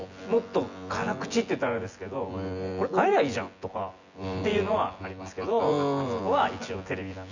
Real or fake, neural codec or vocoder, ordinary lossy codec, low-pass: fake; vocoder, 24 kHz, 100 mel bands, Vocos; Opus, 64 kbps; 7.2 kHz